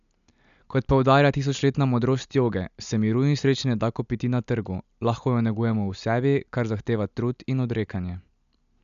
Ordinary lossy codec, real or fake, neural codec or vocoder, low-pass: none; real; none; 7.2 kHz